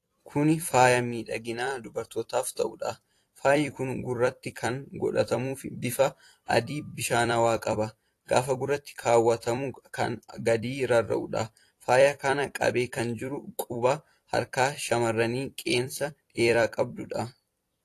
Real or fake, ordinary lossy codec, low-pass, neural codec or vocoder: fake; AAC, 48 kbps; 14.4 kHz; vocoder, 44.1 kHz, 128 mel bands every 256 samples, BigVGAN v2